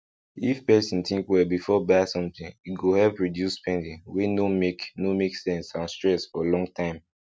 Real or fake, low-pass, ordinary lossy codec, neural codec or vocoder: real; none; none; none